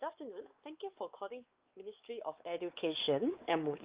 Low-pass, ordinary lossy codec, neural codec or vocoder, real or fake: 3.6 kHz; Opus, 32 kbps; codec, 16 kHz, 4 kbps, X-Codec, WavLM features, trained on Multilingual LibriSpeech; fake